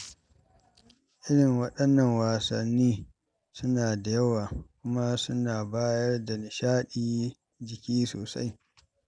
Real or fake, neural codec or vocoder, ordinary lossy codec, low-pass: real; none; none; 9.9 kHz